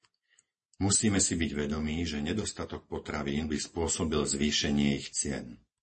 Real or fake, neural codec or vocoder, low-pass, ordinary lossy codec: real; none; 10.8 kHz; MP3, 32 kbps